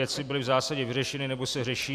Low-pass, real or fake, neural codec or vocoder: 14.4 kHz; fake; vocoder, 44.1 kHz, 128 mel bands every 256 samples, BigVGAN v2